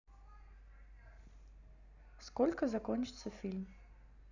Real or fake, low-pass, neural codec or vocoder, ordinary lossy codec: real; 7.2 kHz; none; none